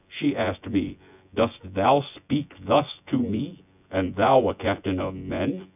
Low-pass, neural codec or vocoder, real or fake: 3.6 kHz; vocoder, 24 kHz, 100 mel bands, Vocos; fake